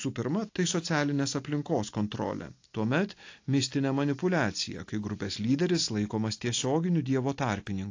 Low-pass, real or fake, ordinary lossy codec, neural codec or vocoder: 7.2 kHz; real; AAC, 48 kbps; none